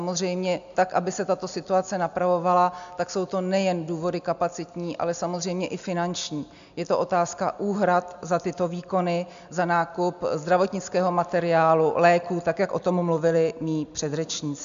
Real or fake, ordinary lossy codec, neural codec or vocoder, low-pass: real; MP3, 64 kbps; none; 7.2 kHz